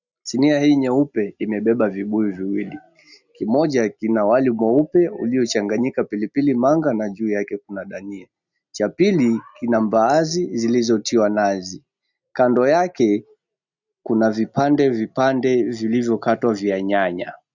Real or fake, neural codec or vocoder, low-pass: real; none; 7.2 kHz